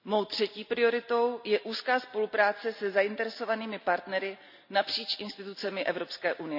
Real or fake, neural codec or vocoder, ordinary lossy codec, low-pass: real; none; none; 5.4 kHz